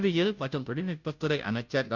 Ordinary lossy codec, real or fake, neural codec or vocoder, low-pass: none; fake; codec, 16 kHz, 0.5 kbps, FunCodec, trained on Chinese and English, 25 frames a second; 7.2 kHz